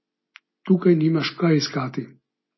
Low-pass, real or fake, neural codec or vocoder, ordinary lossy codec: 7.2 kHz; real; none; MP3, 24 kbps